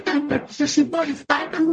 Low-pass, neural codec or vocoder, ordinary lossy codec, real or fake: 10.8 kHz; codec, 44.1 kHz, 0.9 kbps, DAC; MP3, 48 kbps; fake